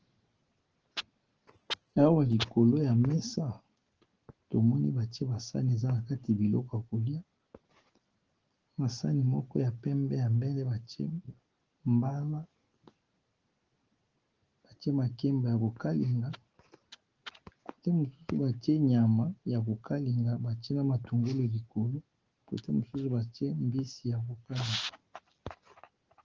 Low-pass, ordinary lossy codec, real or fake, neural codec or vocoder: 7.2 kHz; Opus, 16 kbps; real; none